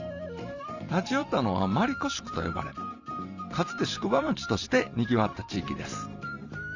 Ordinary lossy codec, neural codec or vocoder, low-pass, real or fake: none; vocoder, 44.1 kHz, 128 mel bands every 512 samples, BigVGAN v2; 7.2 kHz; fake